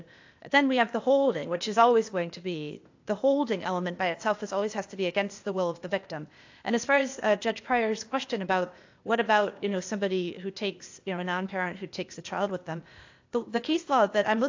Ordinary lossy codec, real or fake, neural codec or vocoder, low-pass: AAC, 48 kbps; fake; codec, 16 kHz, 0.8 kbps, ZipCodec; 7.2 kHz